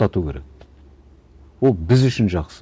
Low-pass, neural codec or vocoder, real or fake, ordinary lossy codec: none; none; real; none